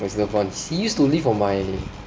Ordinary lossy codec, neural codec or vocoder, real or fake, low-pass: none; none; real; none